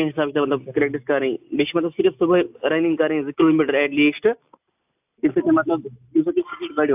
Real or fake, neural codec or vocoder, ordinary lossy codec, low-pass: real; none; AAC, 32 kbps; 3.6 kHz